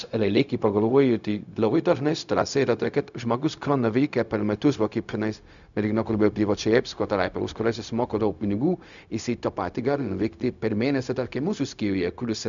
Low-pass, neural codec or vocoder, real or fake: 7.2 kHz; codec, 16 kHz, 0.4 kbps, LongCat-Audio-Codec; fake